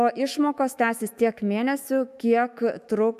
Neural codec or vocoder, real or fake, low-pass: autoencoder, 48 kHz, 128 numbers a frame, DAC-VAE, trained on Japanese speech; fake; 14.4 kHz